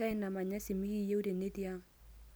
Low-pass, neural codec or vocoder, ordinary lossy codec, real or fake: none; none; none; real